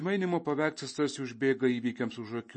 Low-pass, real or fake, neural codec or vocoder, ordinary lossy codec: 10.8 kHz; real; none; MP3, 32 kbps